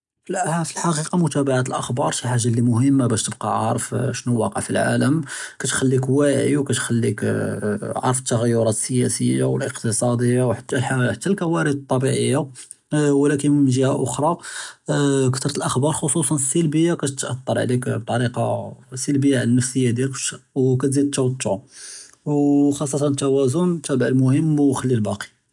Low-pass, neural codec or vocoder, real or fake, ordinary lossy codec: 10.8 kHz; none; real; none